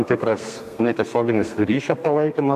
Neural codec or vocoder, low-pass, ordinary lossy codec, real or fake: codec, 32 kHz, 1.9 kbps, SNAC; 14.4 kHz; MP3, 96 kbps; fake